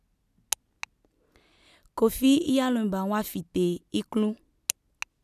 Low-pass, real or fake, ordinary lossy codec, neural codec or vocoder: 14.4 kHz; real; none; none